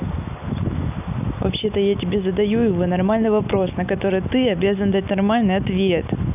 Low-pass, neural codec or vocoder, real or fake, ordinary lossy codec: 3.6 kHz; none; real; none